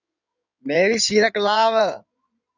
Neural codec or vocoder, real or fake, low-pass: codec, 16 kHz in and 24 kHz out, 2.2 kbps, FireRedTTS-2 codec; fake; 7.2 kHz